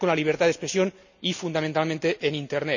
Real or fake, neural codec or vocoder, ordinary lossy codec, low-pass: real; none; none; 7.2 kHz